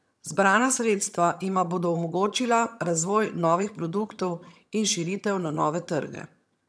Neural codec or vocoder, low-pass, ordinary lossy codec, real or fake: vocoder, 22.05 kHz, 80 mel bands, HiFi-GAN; none; none; fake